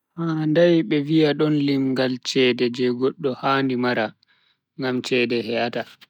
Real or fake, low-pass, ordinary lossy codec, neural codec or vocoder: real; 19.8 kHz; none; none